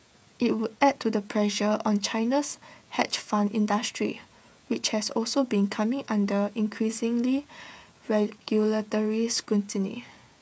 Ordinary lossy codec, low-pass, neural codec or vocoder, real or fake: none; none; codec, 16 kHz, 16 kbps, FreqCodec, smaller model; fake